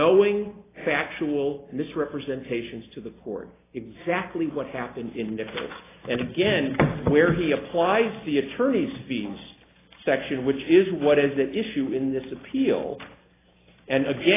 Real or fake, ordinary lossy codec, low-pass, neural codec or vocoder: real; AAC, 16 kbps; 3.6 kHz; none